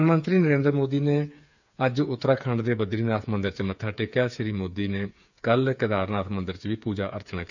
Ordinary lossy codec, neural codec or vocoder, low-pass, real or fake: none; codec, 16 kHz, 8 kbps, FreqCodec, smaller model; 7.2 kHz; fake